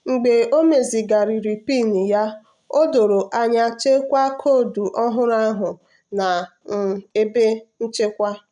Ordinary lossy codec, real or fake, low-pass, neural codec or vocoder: none; real; 10.8 kHz; none